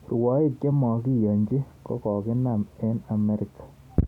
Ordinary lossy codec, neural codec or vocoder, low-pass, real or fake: none; none; 19.8 kHz; real